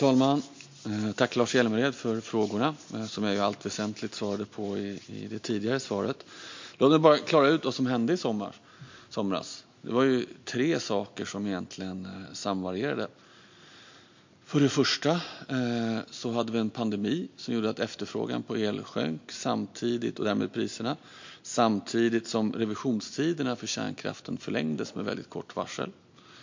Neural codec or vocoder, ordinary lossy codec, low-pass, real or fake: none; MP3, 48 kbps; 7.2 kHz; real